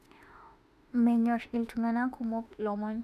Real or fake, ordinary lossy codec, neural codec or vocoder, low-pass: fake; none; autoencoder, 48 kHz, 32 numbers a frame, DAC-VAE, trained on Japanese speech; 14.4 kHz